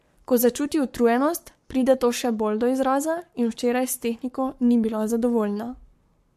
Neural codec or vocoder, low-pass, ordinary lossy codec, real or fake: codec, 44.1 kHz, 7.8 kbps, Pupu-Codec; 14.4 kHz; MP3, 64 kbps; fake